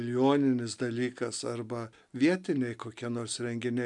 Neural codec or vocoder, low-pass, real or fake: none; 10.8 kHz; real